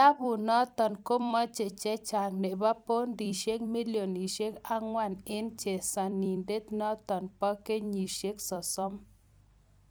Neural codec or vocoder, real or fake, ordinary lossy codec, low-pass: vocoder, 44.1 kHz, 128 mel bands every 256 samples, BigVGAN v2; fake; none; none